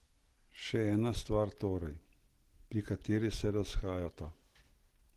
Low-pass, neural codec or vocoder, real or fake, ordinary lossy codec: 14.4 kHz; none; real; Opus, 16 kbps